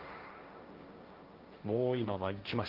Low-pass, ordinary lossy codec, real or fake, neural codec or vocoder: 5.4 kHz; Opus, 32 kbps; fake; codec, 16 kHz, 1.1 kbps, Voila-Tokenizer